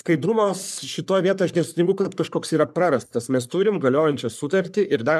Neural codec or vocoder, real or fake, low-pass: codec, 44.1 kHz, 3.4 kbps, Pupu-Codec; fake; 14.4 kHz